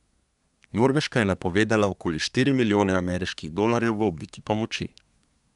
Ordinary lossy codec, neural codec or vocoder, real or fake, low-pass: none; codec, 24 kHz, 1 kbps, SNAC; fake; 10.8 kHz